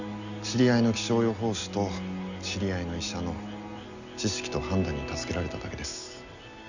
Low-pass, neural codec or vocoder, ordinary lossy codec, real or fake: 7.2 kHz; none; none; real